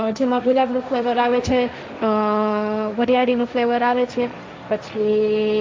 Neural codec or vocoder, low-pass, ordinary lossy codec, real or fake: codec, 16 kHz, 1.1 kbps, Voila-Tokenizer; none; none; fake